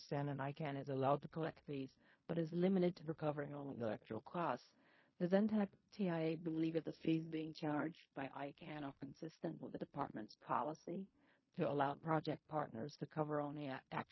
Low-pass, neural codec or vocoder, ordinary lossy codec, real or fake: 7.2 kHz; codec, 16 kHz in and 24 kHz out, 0.4 kbps, LongCat-Audio-Codec, fine tuned four codebook decoder; MP3, 24 kbps; fake